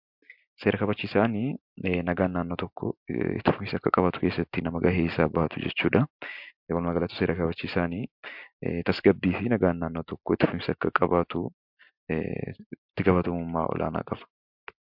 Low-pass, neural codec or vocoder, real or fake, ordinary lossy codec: 5.4 kHz; none; real; MP3, 48 kbps